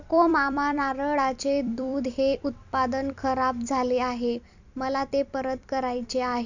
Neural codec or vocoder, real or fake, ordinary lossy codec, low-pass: vocoder, 44.1 kHz, 128 mel bands every 256 samples, BigVGAN v2; fake; none; 7.2 kHz